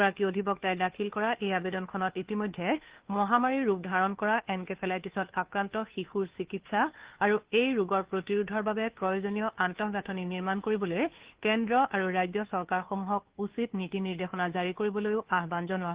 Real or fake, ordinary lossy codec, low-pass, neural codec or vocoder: fake; Opus, 16 kbps; 3.6 kHz; codec, 16 kHz, 2 kbps, FunCodec, trained on Chinese and English, 25 frames a second